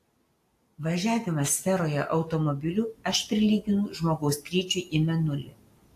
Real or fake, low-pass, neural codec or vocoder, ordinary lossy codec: real; 14.4 kHz; none; AAC, 64 kbps